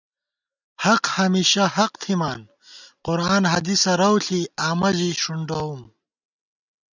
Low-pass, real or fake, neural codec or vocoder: 7.2 kHz; real; none